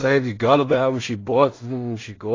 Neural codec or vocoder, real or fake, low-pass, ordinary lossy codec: codec, 16 kHz in and 24 kHz out, 0.4 kbps, LongCat-Audio-Codec, two codebook decoder; fake; 7.2 kHz; AAC, 32 kbps